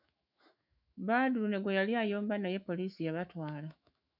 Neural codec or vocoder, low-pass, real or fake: autoencoder, 48 kHz, 128 numbers a frame, DAC-VAE, trained on Japanese speech; 5.4 kHz; fake